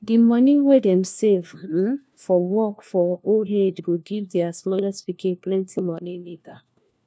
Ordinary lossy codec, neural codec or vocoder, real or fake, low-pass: none; codec, 16 kHz, 1 kbps, FunCodec, trained on LibriTTS, 50 frames a second; fake; none